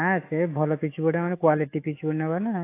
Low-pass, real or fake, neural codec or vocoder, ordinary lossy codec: 3.6 kHz; fake; autoencoder, 48 kHz, 128 numbers a frame, DAC-VAE, trained on Japanese speech; AAC, 24 kbps